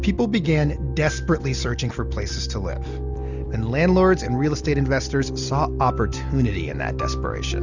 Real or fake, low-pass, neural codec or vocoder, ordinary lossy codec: real; 7.2 kHz; none; Opus, 64 kbps